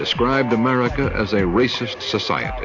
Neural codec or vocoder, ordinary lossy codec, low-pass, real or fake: none; AAC, 48 kbps; 7.2 kHz; real